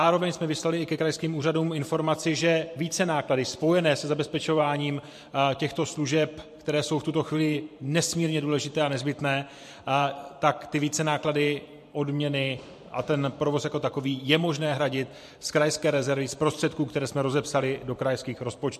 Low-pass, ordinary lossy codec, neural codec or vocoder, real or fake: 14.4 kHz; MP3, 64 kbps; vocoder, 44.1 kHz, 128 mel bands every 512 samples, BigVGAN v2; fake